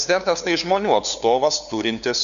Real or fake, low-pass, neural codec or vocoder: fake; 7.2 kHz; codec, 16 kHz, 2 kbps, FunCodec, trained on LibriTTS, 25 frames a second